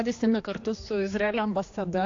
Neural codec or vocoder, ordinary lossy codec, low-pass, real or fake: codec, 16 kHz, 2 kbps, X-Codec, HuBERT features, trained on general audio; AAC, 48 kbps; 7.2 kHz; fake